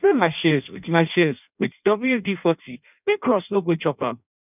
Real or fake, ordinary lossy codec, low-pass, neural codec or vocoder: fake; none; 3.6 kHz; codec, 16 kHz in and 24 kHz out, 0.6 kbps, FireRedTTS-2 codec